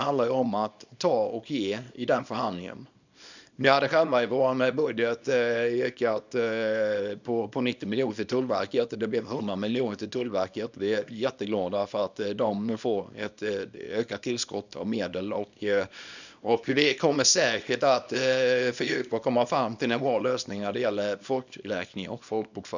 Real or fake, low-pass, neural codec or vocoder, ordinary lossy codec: fake; 7.2 kHz; codec, 24 kHz, 0.9 kbps, WavTokenizer, small release; none